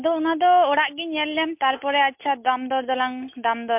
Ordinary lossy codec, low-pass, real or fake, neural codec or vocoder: MP3, 32 kbps; 3.6 kHz; real; none